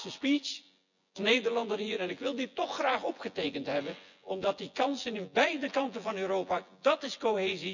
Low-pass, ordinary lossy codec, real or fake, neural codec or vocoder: 7.2 kHz; none; fake; vocoder, 24 kHz, 100 mel bands, Vocos